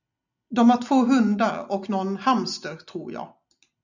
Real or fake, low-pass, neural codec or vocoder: real; 7.2 kHz; none